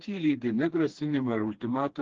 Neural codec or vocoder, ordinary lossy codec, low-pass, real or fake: codec, 16 kHz, 2 kbps, FreqCodec, smaller model; Opus, 16 kbps; 7.2 kHz; fake